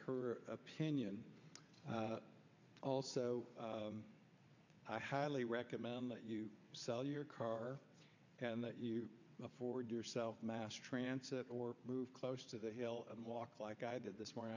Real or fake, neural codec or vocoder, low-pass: fake; vocoder, 22.05 kHz, 80 mel bands, WaveNeXt; 7.2 kHz